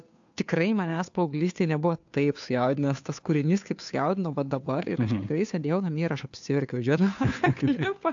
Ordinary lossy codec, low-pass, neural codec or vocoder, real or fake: AAC, 64 kbps; 7.2 kHz; codec, 16 kHz, 2 kbps, FunCodec, trained on Chinese and English, 25 frames a second; fake